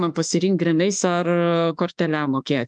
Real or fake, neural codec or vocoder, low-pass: fake; autoencoder, 48 kHz, 32 numbers a frame, DAC-VAE, trained on Japanese speech; 9.9 kHz